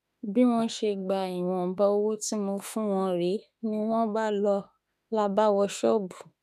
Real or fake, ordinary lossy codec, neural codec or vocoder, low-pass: fake; none; autoencoder, 48 kHz, 32 numbers a frame, DAC-VAE, trained on Japanese speech; 14.4 kHz